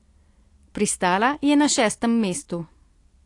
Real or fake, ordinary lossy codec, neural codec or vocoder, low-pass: real; AAC, 48 kbps; none; 10.8 kHz